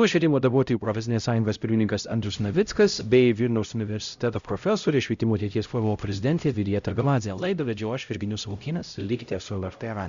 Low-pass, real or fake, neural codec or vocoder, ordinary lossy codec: 7.2 kHz; fake; codec, 16 kHz, 0.5 kbps, X-Codec, HuBERT features, trained on LibriSpeech; Opus, 64 kbps